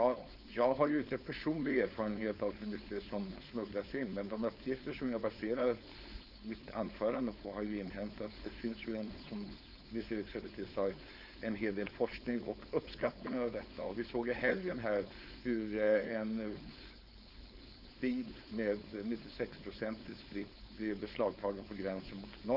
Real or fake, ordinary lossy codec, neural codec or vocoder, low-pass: fake; none; codec, 16 kHz, 4.8 kbps, FACodec; 5.4 kHz